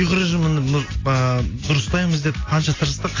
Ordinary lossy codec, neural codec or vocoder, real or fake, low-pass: AAC, 32 kbps; none; real; 7.2 kHz